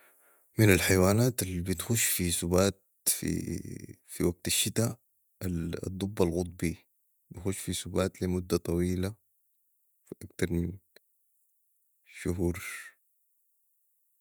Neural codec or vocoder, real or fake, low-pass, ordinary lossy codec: vocoder, 48 kHz, 128 mel bands, Vocos; fake; none; none